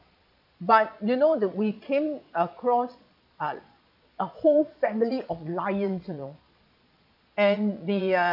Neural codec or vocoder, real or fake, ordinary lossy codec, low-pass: vocoder, 22.05 kHz, 80 mel bands, Vocos; fake; none; 5.4 kHz